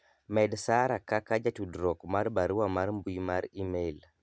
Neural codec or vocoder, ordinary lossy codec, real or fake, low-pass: none; none; real; none